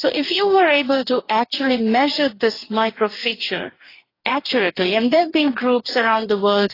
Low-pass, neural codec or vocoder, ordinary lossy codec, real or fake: 5.4 kHz; codec, 44.1 kHz, 2.6 kbps, DAC; AAC, 24 kbps; fake